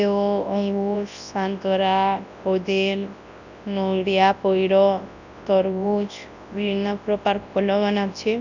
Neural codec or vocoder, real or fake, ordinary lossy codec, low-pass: codec, 24 kHz, 0.9 kbps, WavTokenizer, large speech release; fake; none; 7.2 kHz